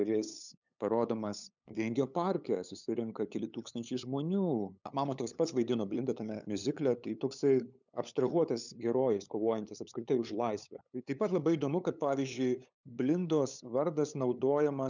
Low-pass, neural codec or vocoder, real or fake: 7.2 kHz; codec, 16 kHz, 8 kbps, FunCodec, trained on LibriTTS, 25 frames a second; fake